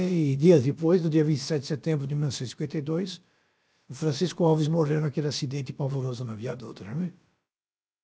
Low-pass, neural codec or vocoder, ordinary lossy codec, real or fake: none; codec, 16 kHz, about 1 kbps, DyCAST, with the encoder's durations; none; fake